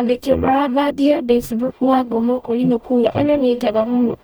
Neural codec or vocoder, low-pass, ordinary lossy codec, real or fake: codec, 44.1 kHz, 0.9 kbps, DAC; none; none; fake